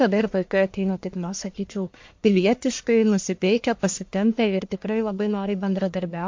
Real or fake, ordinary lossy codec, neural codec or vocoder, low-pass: fake; MP3, 48 kbps; codec, 44.1 kHz, 1.7 kbps, Pupu-Codec; 7.2 kHz